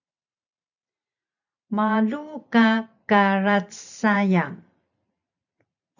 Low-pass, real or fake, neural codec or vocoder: 7.2 kHz; fake; vocoder, 44.1 kHz, 128 mel bands every 512 samples, BigVGAN v2